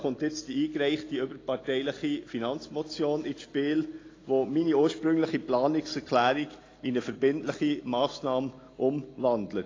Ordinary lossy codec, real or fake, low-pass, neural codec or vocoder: AAC, 32 kbps; real; 7.2 kHz; none